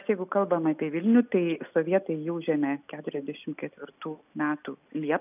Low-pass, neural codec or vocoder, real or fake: 3.6 kHz; none; real